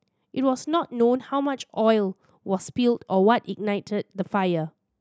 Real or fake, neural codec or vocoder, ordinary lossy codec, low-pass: real; none; none; none